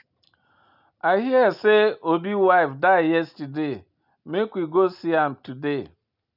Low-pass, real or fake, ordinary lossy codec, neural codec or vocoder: 5.4 kHz; real; none; none